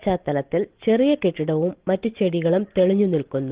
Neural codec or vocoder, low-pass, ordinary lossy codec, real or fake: none; 3.6 kHz; Opus, 16 kbps; real